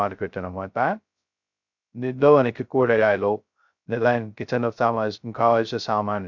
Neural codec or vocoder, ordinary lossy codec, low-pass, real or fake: codec, 16 kHz, 0.2 kbps, FocalCodec; MP3, 64 kbps; 7.2 kHz; fake